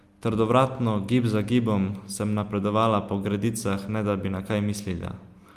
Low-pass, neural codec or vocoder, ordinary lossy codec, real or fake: 14.4 kHz; none; Opus, 32 kbps; real